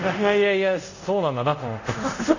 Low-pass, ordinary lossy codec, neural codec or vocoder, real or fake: 7.2 kHz; none; codec, 24 kHz, 0.5 kbps, DualCodec; fake